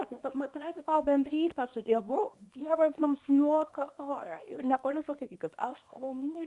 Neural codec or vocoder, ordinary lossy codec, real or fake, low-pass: codec, 24 kHz, 0.9 kbps, WavTokenizer, small release; Opus, 64 kbps; fake; 10.8 kHz